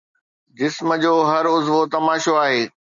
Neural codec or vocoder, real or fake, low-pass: none; real; 7.2 kHz